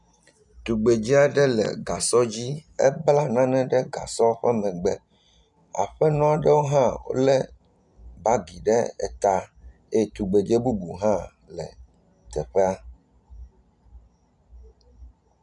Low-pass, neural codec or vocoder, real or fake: 10.8 kHz; none; real